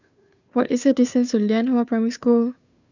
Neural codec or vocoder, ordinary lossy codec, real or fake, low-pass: codec, 16 kHz, 2 kbps, FunCodec, trained on Chinese and English, 25 frames a second; none; fake; 7.2 kHz